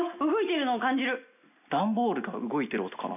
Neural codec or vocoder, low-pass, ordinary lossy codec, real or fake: none; 3.6 kHz; none; real